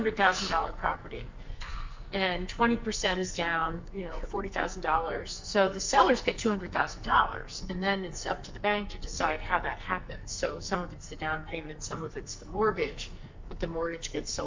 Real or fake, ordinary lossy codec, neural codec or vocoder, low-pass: fake; MP3, 64 kbps; codec, 44.1 kHz, 2.6 kbps, SNAC; 7.2 kHz